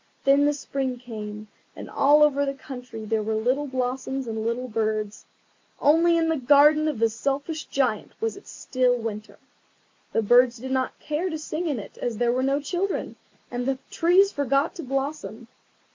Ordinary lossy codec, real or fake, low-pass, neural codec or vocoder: MP3, 48 kbps; real; 7.2 kHz; none